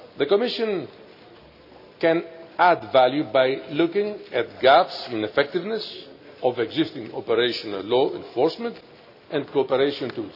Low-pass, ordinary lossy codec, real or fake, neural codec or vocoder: 5.4 kHz; none; real; none